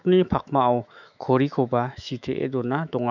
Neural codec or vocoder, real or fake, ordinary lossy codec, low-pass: autoencoder, 48 kHz, 128 numbers a frame, DAC-VAE, trained on Japanese speech; fake; none; 7.2 kHz